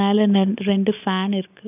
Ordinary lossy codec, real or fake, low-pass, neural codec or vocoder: none; real; 3.6 kHz; none